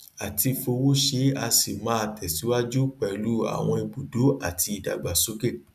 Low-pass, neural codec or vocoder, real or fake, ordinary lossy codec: 14.4 kHz; none; real; none